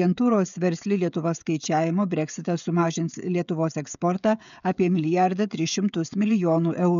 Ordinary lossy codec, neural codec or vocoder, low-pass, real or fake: MP3, 96 kbps; codec, 16 kHz, 16 kbps, FreqCodec, smaller model; 7.2 kHz; fake